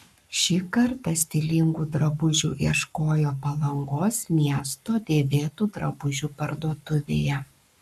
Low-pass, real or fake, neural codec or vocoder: 14.4 kHz; fake; codec, 44.1 kHz, 7.8 kbps, Pupu-Codec